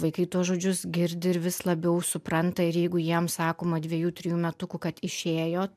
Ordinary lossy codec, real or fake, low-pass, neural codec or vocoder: MP3, 96 kbps; real; 14.4 kHz; none